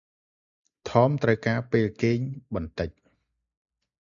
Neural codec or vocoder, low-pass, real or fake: none; 7.2 kHz; real